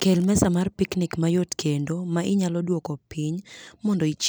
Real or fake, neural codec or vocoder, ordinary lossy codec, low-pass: real; none; none; none